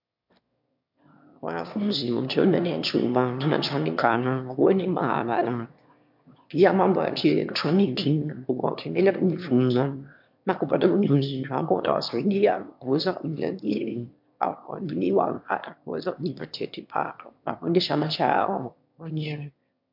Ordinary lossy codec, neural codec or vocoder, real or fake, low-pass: MP3, 48 kbps; autoencoder, 22.05 kHz, a latent of 192 numbers a frame, VITS, trained on one speaker; fake; 5.4 kHz